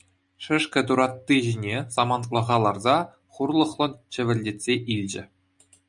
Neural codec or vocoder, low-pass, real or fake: none; 10.8 kHz; real